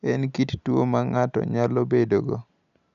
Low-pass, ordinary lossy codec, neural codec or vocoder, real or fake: 7.2 kHz; none; none; real